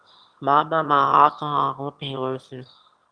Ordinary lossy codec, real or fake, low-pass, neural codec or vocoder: Opus, 24 kbps; fake; 9.9 kHz; autoencoder, 22.05 kHz, a latent of 192 numbers a frame, VITS, trained on one speaker